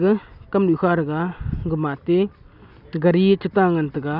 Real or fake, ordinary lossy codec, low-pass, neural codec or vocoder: real; none; 5.4 kHz; none